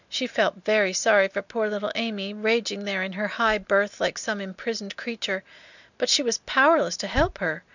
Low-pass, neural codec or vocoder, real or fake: 7.2 kHz; none; real